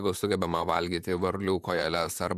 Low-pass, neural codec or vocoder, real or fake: 14.4 kHz; vocoder, 44.1 kHz, 128 mel bands, Pupu-Vocoder; fake